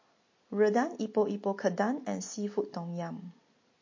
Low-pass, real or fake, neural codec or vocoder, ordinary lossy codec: 7.2 kHz; real; none; MP3, 32 kbps